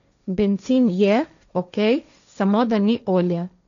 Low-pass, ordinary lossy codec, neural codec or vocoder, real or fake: 7.2 kHz; none; codec, 16 kHz, 1.1 kbps, Voila-Tokenizer; fake